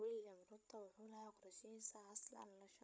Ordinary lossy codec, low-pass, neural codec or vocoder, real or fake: none; none; codec, 16 kHz, 16 kbps, FreqCodec, larger model; fake